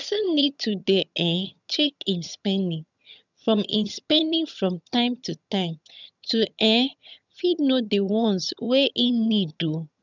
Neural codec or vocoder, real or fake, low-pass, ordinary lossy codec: vocoder, 22.05 kHz, 80 mel bands, HiFi-GAN; fake; 7.2 kHz; none